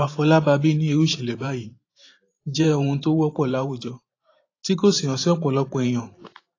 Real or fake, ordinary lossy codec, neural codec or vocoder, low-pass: fake; AAC, 32 kbps; vocoder, 44.1 kHz, 128 mel bands every 512 samples, BigVGAN v2; 7.2 kHz